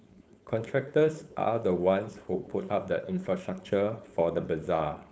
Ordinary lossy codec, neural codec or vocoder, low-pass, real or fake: none; codec, 16 kHz, 4.8 kbps, FACodec; none; fake